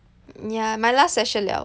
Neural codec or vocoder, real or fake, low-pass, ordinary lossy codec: none; real; none; none